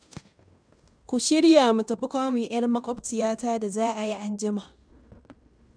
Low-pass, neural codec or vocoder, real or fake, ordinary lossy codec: 9.9 kHz; codec, 16 kHz in and 24 kHz out, 0.9 kbps, LongCat-Audio-Codec, fine tuned four codebook decoder; fake; none